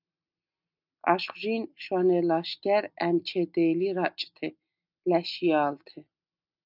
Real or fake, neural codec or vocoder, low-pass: real; none; 5.4 kHz